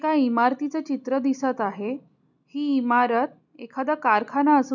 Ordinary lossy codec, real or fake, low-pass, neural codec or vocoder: none; real; 7.2 kHz; none